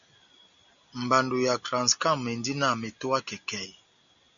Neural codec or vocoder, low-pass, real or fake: none; 7.2 kHz; real